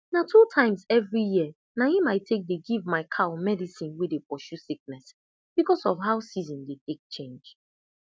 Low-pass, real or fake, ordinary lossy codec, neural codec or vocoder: none; real; none; none